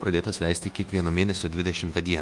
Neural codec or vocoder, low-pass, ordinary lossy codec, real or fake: codec, 24 kHz, 1.2 kbps, DualCodec; 10.8 kHz; Opus, 32 kbps; fake